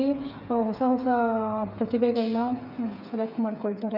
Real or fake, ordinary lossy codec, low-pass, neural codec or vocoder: fake; none; 5.4 kHz; codec, 16 kHz, 8 kbps, FreqCodec, smaller model